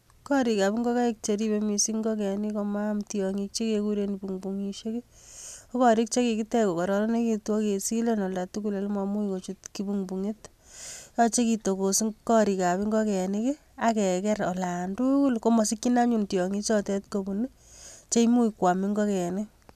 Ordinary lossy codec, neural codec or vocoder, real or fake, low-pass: none; none; real; 14.4 kHz